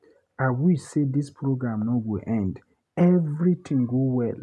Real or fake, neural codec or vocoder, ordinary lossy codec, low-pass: real; none; none; none